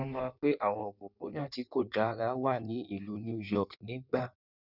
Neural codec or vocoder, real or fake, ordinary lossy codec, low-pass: codec, 16 kHz in and 24 kHz out, 1.1 kbps, FireRedTTS-2 codec; fake; none; 5.4 kHz